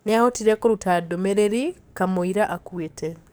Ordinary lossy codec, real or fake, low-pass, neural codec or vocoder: none; fake; none; codec, 44.1 kHz, 7.8 kbps, DAC